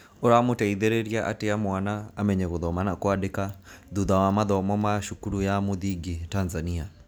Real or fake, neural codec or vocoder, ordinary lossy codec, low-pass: real; none; none; none